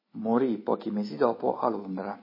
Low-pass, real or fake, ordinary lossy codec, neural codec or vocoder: 5.4 kHz; real; MP3, 24 kbps; none